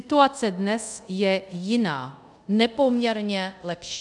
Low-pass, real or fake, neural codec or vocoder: 10.8 kHz; fake; codec, 24 kHz, 0.5 kbps, DualCodec